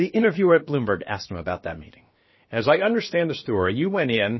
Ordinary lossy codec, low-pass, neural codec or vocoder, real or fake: MP3, 24 kbps; 7.2 kHz; codec, 16 kHz, about 1 kbps, DyCAST, with the encoder's durations; fake